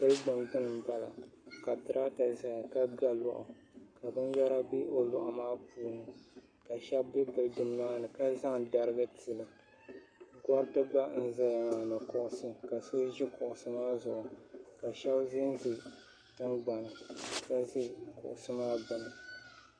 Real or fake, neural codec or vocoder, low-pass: fake; codec, 44.1 kHz, 7.8 kbps, Pupu-Codec; 9.9 kHz